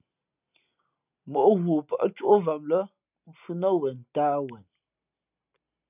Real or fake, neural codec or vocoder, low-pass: real; none; 3.6 kHz